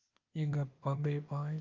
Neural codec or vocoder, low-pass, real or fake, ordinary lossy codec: codec, 16 kHz, 0.8 kbps, ZipCodec; 7.2 kHz; fake; Opus, 32 kbps